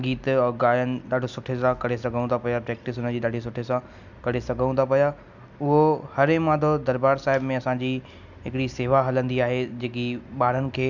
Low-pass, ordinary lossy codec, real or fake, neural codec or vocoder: 7.2 kHz; none; real; none